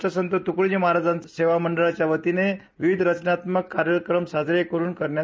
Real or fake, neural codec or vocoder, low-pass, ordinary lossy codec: real; none; none; none